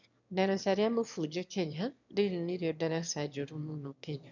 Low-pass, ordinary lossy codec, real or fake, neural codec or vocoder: 7.2 kHz; none; fake; autoencoder, 22.05 kHz, a latent of 192 numbers a frame, VITS, trained on one speaker